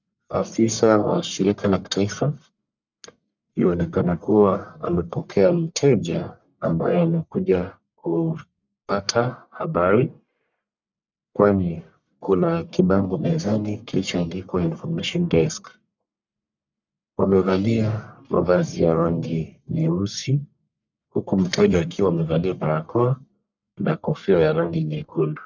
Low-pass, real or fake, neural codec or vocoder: 7.2 kHz; fake; codec, 44.1 kHz, 1.7 kbps, Pupu-Codec